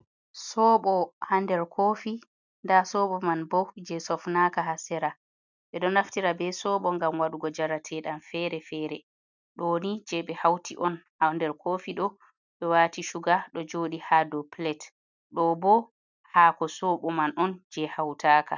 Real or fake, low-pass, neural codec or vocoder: real; 7.2 kHz; none